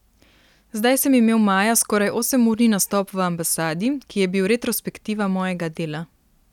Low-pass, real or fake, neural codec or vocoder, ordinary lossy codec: 19.8 kHz; real; none; none